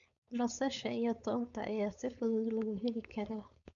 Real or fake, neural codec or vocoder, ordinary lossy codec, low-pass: fake; codec, 16 kHz, 4.8 kbps, FACodec; none; 7.2 kHz